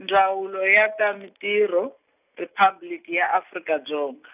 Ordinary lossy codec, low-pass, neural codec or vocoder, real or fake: none; 3.6 kHz; none; real